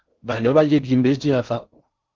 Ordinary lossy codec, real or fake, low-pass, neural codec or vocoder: Opus, 24 kbps; fake; 7.2 kHz; codec, 16 kHz in and 24 kHz out, 0.6 kbps, FocalCodec, streaming, 4096 codes